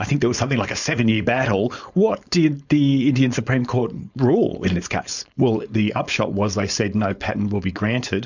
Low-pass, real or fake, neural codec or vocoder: 7.2 kHz; fake; codec, 16 kHz, 4.8 kbps, FACodec